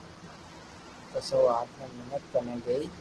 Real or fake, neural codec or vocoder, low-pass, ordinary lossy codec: real; none; 10.8 kHz; Opus, 16 kbps